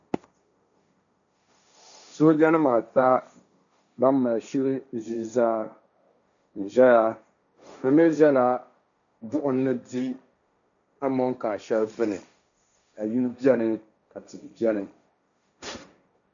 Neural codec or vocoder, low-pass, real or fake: codec, 16 kHz, 1.1 kbps, Voila-Tokenizer; 7.2 kHz; fake